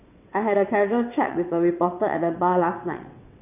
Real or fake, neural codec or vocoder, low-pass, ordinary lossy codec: fake; vocoder, 22.05 kHz, 80 mel bands, WaveNeXt; 3.6 kHz; none